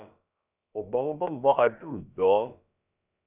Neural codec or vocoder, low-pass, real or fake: codec, 16 kHz, about 1 kbps, DyCAST, with the encoder's durations; 3.6 kHz; fake